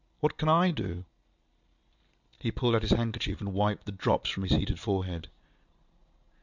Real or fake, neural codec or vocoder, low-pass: real; none; 7.2 kHz